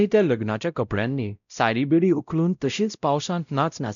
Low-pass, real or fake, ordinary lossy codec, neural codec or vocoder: 7.2 kHz; fake; none; codec, 16 kHz, 0.5 kbps, X-Codec, WavLM features, trained on Multilingual LibriSpeech